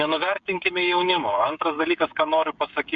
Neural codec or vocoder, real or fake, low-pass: codec, 16 kHz, 16 kbps, FreqCodec, smaller model; fake; 7.2 kHz